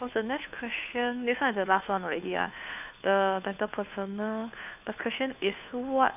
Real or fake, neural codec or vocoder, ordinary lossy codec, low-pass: fake; codec, 16 kHz, 2 kbps, FunCodec, trained on Chinese and English, 25 frames a second; none; 3.6 kHz